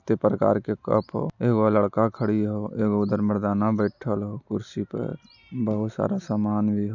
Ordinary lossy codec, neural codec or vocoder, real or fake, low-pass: none; none; real; 7.2 kHz